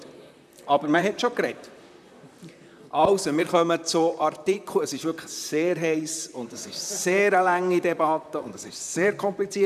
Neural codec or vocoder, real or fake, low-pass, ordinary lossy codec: vocoder, 44.1 kHz, 128 mel bands, Pupu-Vocoder; fake; 14.4 kHz; none